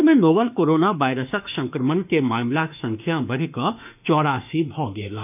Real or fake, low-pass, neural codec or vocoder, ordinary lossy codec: fake; 3.6 kHz; autoencoder, 48 kHz, 32 numbers a frame, DAC-VAE, trained on Japanese speech; none